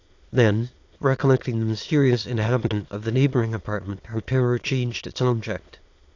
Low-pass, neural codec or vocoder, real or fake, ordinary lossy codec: 7.2 kHz; autoencoder, 22.05 kHz, a latent of 192 numbers a frame, VITS, trained on many speakers; fake; AAC, 48 kbps